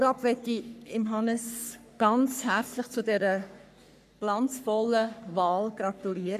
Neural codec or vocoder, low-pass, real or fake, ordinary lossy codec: codec, 44.1 kHz, 3.4 kbps, Pupu-Codec; 14.4 kHz; fake; none